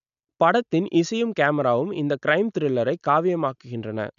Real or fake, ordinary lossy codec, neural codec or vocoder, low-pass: real; none; none; 7.2 kHz